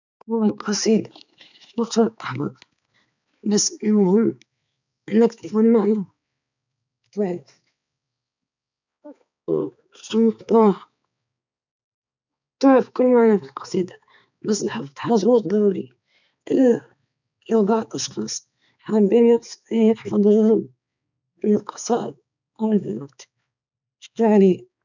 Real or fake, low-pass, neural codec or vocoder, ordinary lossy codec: fake; 7.2 kHz; codec, 16 kHz, 4 kbps, X-Codec, HuBERT features, trained on balanced general audio; none